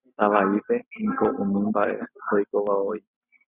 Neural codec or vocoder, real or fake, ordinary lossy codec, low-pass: none; real; Opus, 64 kbps; 3.6 kHz